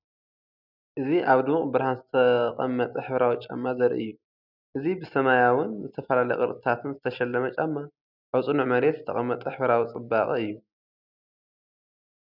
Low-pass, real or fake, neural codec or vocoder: 5.4 kHz; real; none